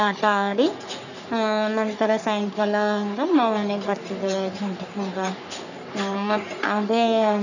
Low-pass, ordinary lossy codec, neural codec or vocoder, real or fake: 7.2 kHz; none; codec, 44.1 kHz, 3.4 kbps, Pupu-Codec; fake